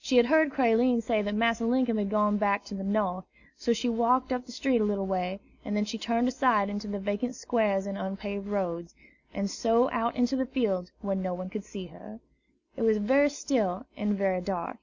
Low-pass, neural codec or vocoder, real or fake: 7.2 kHz; none; real